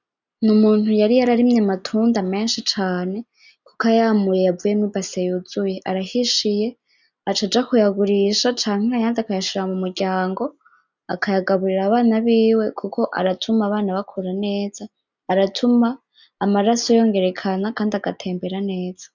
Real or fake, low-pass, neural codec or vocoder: real; 7.2 kHz; none